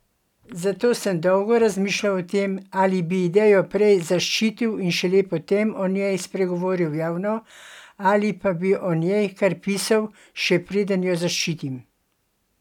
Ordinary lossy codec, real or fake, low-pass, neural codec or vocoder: none; real; 19.8 kHz; none